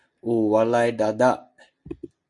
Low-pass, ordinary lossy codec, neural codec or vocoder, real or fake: 10.8 kHz; AAC, 64 kbps; none; real